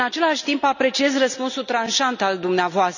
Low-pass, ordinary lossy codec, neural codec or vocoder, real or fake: 7.2 kHz; none; none; real